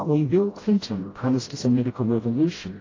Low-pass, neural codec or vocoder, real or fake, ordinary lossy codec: 7.2 kHz; codec, 16 kHz, 0.5 kbps, FreqCodec, smaller model; fake; AAC, 32 kbps